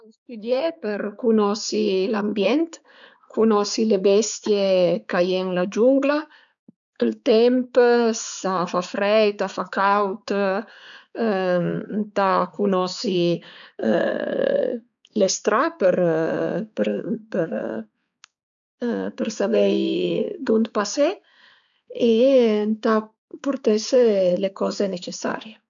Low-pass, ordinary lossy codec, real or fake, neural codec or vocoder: 7.2 kHz; none; fake; codec, 16 kHz, 4 kbps, X-Codec, HuBERT features, trained on balanced general audio